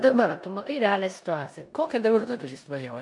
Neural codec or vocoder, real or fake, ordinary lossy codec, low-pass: codec, 16 kHz in and 24 kHz out, 0.9 kbps, LongCat-Audio-Codec, four codebook decoder; fake; MP3, 64 kbps; 10.8 kHz